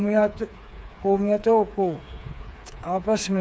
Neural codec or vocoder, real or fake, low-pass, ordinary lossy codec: codec, 16 kHz, 4 kbps, FreqCodec, smaller model; fake; none; none